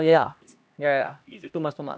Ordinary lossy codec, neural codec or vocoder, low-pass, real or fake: none; codec, 16 kHz, 1 kbps, X-Codec, HuBERT features, trained on LibriSpeech; none; fake